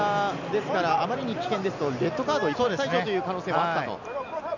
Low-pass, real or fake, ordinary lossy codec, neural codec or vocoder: 7.2 kHz; real; none; none